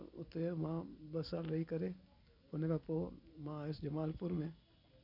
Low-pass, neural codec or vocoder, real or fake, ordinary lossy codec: 5.4 kHz; none; real; none